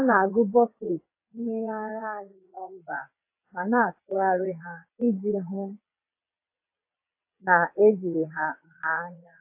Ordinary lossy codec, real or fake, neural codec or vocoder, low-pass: AAC, 32 kbps; fake; vocoder, 22.05 kHz, 80 mel bands, WaveNeXt; 3.6 kHz